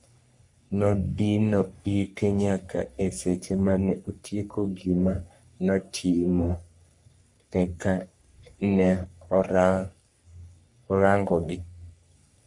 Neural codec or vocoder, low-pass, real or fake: codec, 44.1 kHz, 3.4 kbps, Pupu-Codec; 10.8 kHz; fake